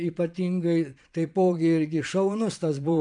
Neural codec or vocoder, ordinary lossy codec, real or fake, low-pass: vocoder, 22.05 kHz, 80 mel bands, Vocos; MP3, 96 kbps; fake; 9.9 kHz